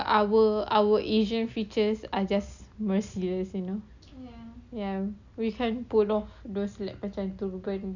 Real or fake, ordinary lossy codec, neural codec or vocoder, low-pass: real; none; none; 7.2 kHz